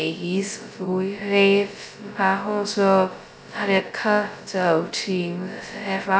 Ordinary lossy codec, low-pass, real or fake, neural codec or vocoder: none; none; fake; codec, 16 kHz, 0.2 kbps, FocalCodec